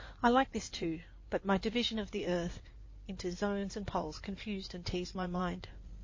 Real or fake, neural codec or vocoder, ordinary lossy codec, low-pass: fake; codec, 16 kHz in and 24 kHz out, 2.2 kbps, FireRedTTS-2 codec; MP3, 32 kbps; 7.2 kHz